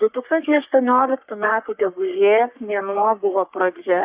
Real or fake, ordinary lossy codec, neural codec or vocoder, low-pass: fake; Opus, 64 kbps; codec, 44.1 kHz, 3.4 kbps, Pupu-Codec; 3.6 kHz